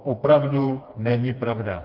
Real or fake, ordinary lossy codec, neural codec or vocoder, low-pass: fake; Opus, 32 kbps; codec, 16 kHz, 2 kbps, FreqCodec, smaller model; 5.4 kHz